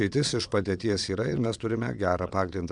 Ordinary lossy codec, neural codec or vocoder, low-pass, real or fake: MP3, 96 kbps; vocoder, 22.05 kHz, 80 mel bands, Vocos; 9.9 kHz; fake